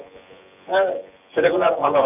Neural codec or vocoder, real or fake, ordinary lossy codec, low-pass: vocoder, 24 kHz, 100 mel bands, Vocos; fake; none; 3.6 kHz